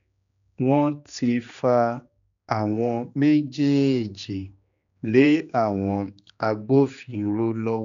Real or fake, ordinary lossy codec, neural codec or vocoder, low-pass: fake; none; codec, 16 kHz, 2 kbps, X-Codec, HuBERT features, trained on general audio; 7.2 kHz